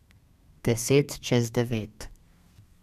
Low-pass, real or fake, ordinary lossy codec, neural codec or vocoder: 14.4 kHz; fake; none; codec, 32 kHz, 1.9 kbps, SNAC